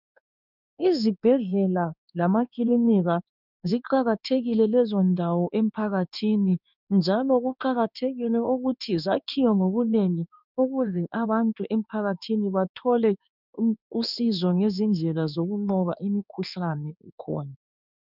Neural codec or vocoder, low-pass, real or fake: codec, 16 kHz in and 24 kHz out, 1 kbps, XY-Tokenizer; 5.4 kHz; fake